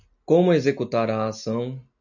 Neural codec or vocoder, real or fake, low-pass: none; real; 7.2 kHz